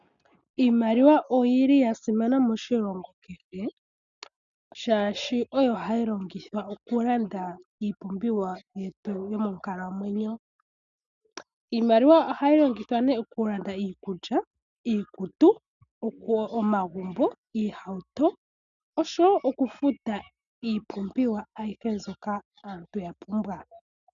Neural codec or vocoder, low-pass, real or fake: none; 7.2 kHz; real